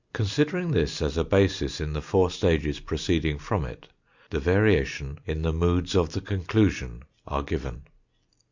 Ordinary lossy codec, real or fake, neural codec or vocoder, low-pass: Opus, 64 kbps; real; none; 7.2 kHz